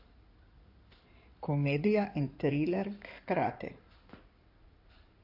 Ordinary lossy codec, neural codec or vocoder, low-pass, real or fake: AAC, 32 kbps; codec, 16 kHz in and 24 kHz out, 2.2 kbps, FireRedTTS-2 codec; 5.4 kHz; fake